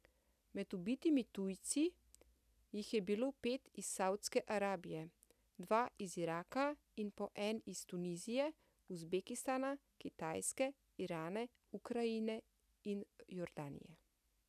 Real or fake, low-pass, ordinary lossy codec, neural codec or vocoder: real; 14.4 kHz; none; none